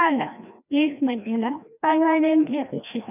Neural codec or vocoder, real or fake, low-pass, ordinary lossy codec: codec, 16 kHz, 1 kbps, FreqCodec, larger model; fake; 3.6 kHz; none